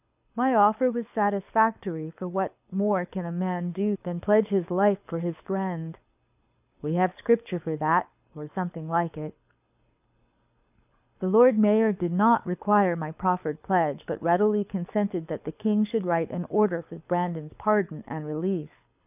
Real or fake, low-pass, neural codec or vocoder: fake; 3.6 kHz; codec, 24 kHz, 6 kbps, HILCodec